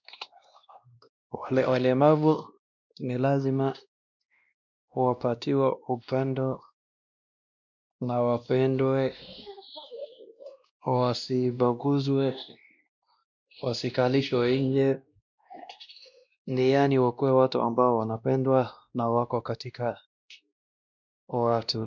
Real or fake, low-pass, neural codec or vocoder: fake; 7.2 kHz; codec, 16 kHz, 1 kbps, X-Codec, WavLM features, trained on Multilingual LibriSpeech